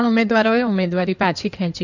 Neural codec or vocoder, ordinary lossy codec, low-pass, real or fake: codec, 16 kHz in and 24 kHz out, 2.2 kbps, FireRedTTS-2 codec; none; 7.2 kHz; fake